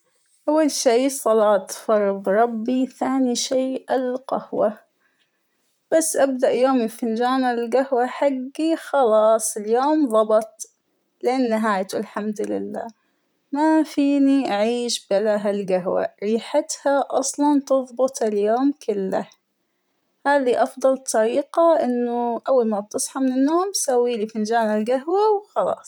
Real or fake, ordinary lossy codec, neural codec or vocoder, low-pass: fake; none; vocoder, 44.1 kHz, 128 mel bands, Pupu-Vocoder; none